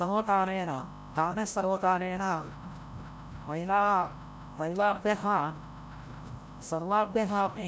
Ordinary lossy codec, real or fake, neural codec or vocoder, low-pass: none; fake; codec, 16 kHz, 0.5 kbps, FreqCodec, larger model; none